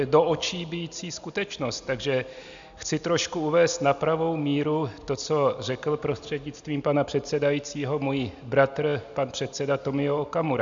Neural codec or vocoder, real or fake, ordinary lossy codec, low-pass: none; real; MP3, 64 kbps; 7.2 kHz